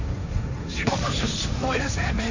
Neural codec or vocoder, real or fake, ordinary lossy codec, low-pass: codec, 16 kHz, 1.1 kbps, Voila-Tokenizer; fake; none; 7.2 kHz